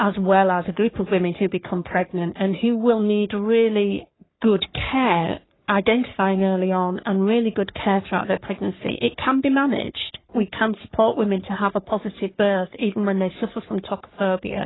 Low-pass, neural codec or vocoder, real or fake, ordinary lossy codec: 7.2 kHz; codec, 44.1 kHz, 3.4 kbps, Pupu-Codec; fake; AAC, 16 kbps